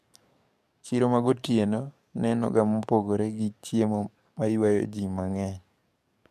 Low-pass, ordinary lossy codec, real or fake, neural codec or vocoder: 14.4 kHz; MP3, 96 kbps; fake; codec, 44.1 kHz, 7.8 kbps, DAC